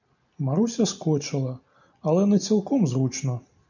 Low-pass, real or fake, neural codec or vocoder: 7.2 kHz; real; none